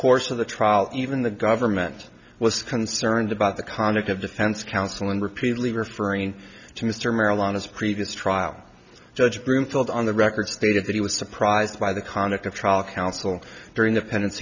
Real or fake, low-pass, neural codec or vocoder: real; 7.2 kHz; none